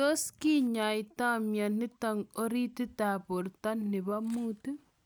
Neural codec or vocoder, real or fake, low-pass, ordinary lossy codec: none; real; none; none